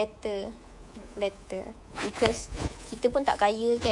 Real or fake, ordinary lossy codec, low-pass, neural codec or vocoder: fake; MP3, 64 kbps; 10.8 kHz; codec, 24 kHz, 3.1 kbps, DualCodec